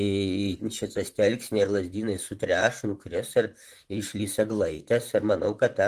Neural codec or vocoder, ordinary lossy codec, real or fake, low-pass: vocoder, 44.1 kHz, 128 mel bands, Pupu-Vocoder; Opus, 24 kbps; fake; 14.4 kHz